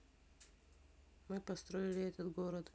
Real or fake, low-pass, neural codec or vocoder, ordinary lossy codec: real; none; none; none